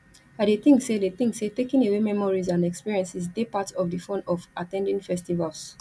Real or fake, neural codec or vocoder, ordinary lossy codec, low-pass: real; none; none; none